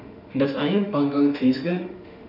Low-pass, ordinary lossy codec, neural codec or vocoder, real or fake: 5.4 kHz; none; autoencoder, 48 kHz, 32 numbers a frame, DAC-VAE, trained on Japanese speech; fake